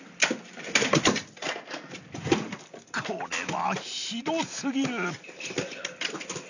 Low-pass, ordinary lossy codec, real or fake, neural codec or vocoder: 7.2 kHz; none; real; none